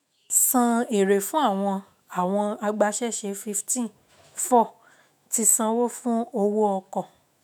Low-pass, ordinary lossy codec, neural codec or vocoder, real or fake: none; none; autoencoder, 48 kHz, 128 numbers a frame, DAC-VAE, trained on Japanese speech; fake